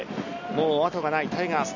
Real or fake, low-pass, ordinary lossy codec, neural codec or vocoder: real; 7.2 kHz; none; none